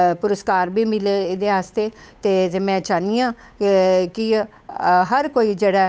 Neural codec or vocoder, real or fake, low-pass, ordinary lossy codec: codec, 16 kHz, 8 kbps, FunCodec, trained on Chinese and English, 25 frames a second; fake; none; none